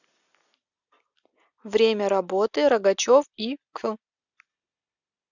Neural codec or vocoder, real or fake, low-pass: none; real; 7.2 kHz